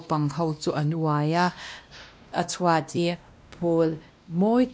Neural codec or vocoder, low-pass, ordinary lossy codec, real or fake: codec, 16 kHz, 1 kbps, X-Codec, WavLM features, trained on Multilingual LibriSpeech; none; none; fake